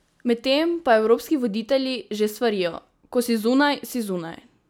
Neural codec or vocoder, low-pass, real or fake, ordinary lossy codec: none; none; real; none